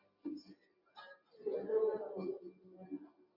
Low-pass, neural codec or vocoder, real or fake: 5.4 kHz; none; real